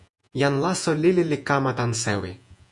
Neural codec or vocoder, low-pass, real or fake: vocoder, 48 kHz, 128 mel bands, Vocos; 10.8 kHz; fake